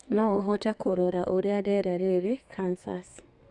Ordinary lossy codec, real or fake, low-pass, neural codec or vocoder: none; fake; 10.8 kHz; codec, 32 kHz, 1.9 kbps, SNAC